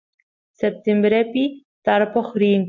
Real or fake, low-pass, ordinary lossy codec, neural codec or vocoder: real; 7.2 kHz; MP3, 48 kbps; none